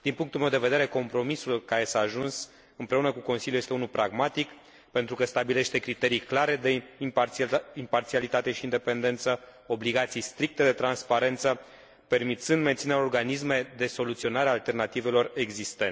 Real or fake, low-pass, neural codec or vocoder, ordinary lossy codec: real; none; none; none